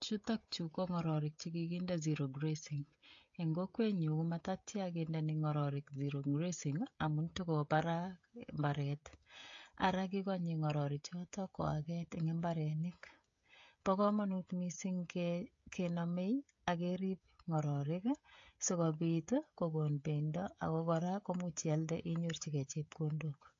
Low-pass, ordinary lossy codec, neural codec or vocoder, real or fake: 7.2 kHz; AAC, 48 kbps; codec, 16 kHz, 16 kbps, FreqCodec, smaller model; fake